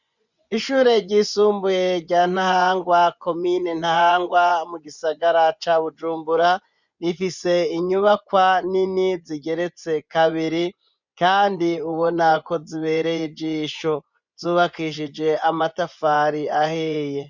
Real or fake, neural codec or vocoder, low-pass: fake; vocoder, 24 kHz, 100 mel bands, Vocos; 7.2 kHz